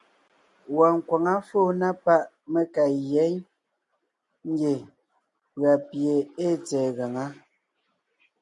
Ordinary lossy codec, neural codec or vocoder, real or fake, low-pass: MP3, 96 kbps; none; real; 10.8 kHz